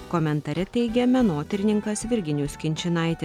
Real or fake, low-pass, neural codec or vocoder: real; 19.8 kHz; none